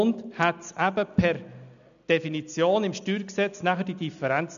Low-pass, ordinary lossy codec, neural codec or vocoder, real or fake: 7.2 kHz; none; none; real